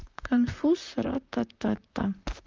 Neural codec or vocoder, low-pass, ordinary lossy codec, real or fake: vocoder, 44.1 kHz, 128 mel bands, Pupu-Vocoder; 7.2 kHz; Opus, 32 kbps; fake